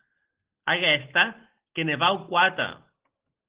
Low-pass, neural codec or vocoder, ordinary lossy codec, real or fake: 3.6 kHz; none; Opus, 16 kbps; real